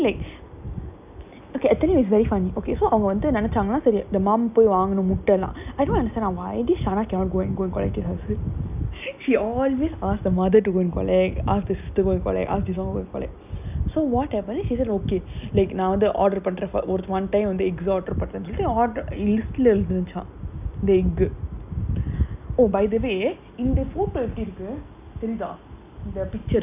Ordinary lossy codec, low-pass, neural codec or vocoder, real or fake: none; 3.6 kHz; none; real